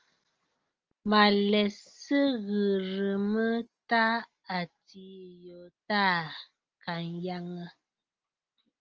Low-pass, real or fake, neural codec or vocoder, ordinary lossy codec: 7.2 kHz; real; none; Opus, 24 kbps